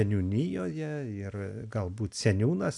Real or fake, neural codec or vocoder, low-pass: real; none; 10.8 kHz